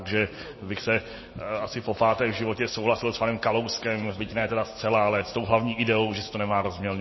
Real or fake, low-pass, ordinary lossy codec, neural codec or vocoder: real; 7.2 kHz; MP3, 24 kbps; none